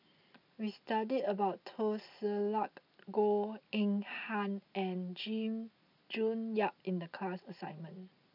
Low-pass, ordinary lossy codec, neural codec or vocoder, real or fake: 5.4 kHz; none; none; real